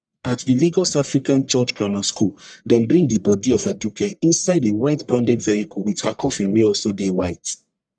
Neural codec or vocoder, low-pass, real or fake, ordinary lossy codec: codec, 44.1 kHz, 1.7 kbps, Pupu-Codec; 9.9 kHz; fake; none